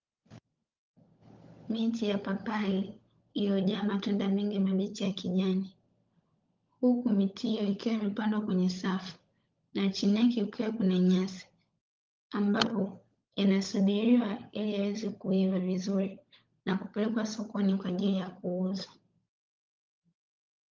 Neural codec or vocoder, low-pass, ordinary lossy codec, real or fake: codec, 16 kHz, 16 kbps, FunCodec, trained on LibriTTS, 50 frames a second; 7.2 kHz; Opus, 32 kbps; fake